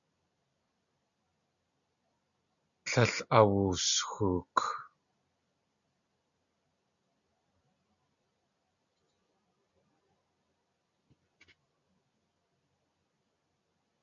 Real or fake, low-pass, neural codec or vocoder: real; 7.2 kHz; none